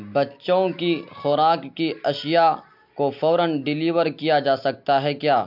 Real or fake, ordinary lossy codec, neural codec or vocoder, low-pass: real; MP3, 48 kbps; none; 5.4 kHz